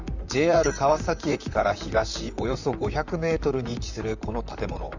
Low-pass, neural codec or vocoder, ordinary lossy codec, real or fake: 7.2 kHz; vocoder, 44.1 kHz, 128 mel bands, Pupu-Vocoder; none; fake